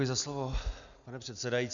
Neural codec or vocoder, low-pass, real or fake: none; 7.2 kHz; real